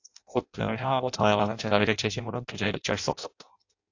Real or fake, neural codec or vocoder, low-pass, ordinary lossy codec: fake; codec, 16 kHz in and 24 kHz out, 0.6 kbps, FireRedTTS-2 codec; 7.2 kHz; AAC, 48 kbps